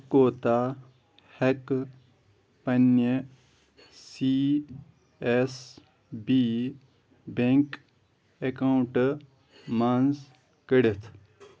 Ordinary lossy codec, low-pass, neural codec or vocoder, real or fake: none; none; none; real